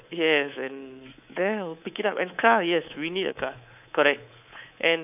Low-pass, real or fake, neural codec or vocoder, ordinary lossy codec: 3.6 kHz; fake; codec, 24 kHz, 3.1 kbps, DualCodec; none